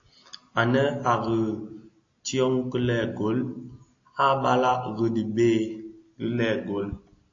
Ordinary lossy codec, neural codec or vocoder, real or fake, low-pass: AAC, 48 kbps; none; real; 7.2 kHz